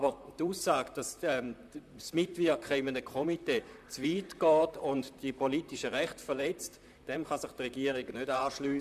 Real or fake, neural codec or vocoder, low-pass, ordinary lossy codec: fake; vocoder, 44.1 kHz, 128 mel bands, Pupu-Vocoder; 14.4 kHz; MP3, 96 kbps